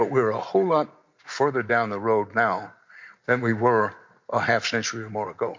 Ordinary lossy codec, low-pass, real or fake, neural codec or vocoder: MP3, 48 kbps; 7.2 kHz; real; none